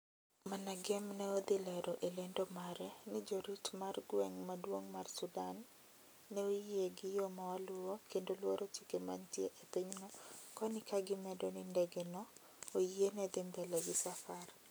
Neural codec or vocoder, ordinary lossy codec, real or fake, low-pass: none; none; real; none